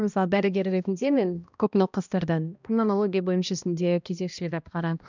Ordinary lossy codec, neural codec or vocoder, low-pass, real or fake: none; codec, 16 kHz, 1 kbps, X-Codec, HuBERT features, trained on balanced general audio; 7.2 kHz; fake